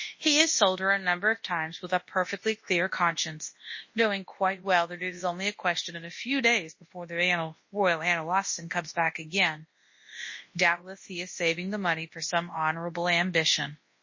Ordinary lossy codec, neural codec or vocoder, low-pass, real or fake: MP3, 32 kbps; codec, 24 kHz, 0.9 kbps, WavTokenizer, large speech release; 7.2 kHz; fake